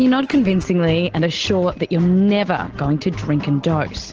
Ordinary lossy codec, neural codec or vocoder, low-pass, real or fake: Opus, 16 kbps; none; 7.2 kHz; real